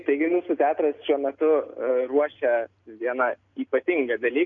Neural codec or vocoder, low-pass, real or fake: none; 7.2 kHz; real